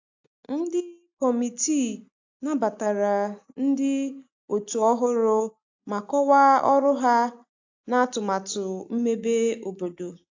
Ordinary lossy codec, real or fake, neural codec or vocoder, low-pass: AAC, 48 kbps; real; none; 7.2 kHz